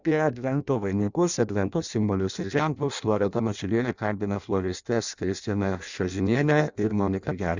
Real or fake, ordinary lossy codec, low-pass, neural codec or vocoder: fake; Opus, 64 kbps; 7.2 kHz; codec, 16 kHz in and 24 kHz out, 0.6 kbps, FireRedTTS-2 codec